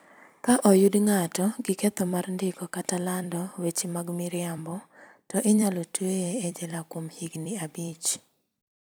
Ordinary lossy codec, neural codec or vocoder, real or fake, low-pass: none; vocoder, 44.1 kHz, 128 mel bands every 256 samples, BigVGAN v2; fake; none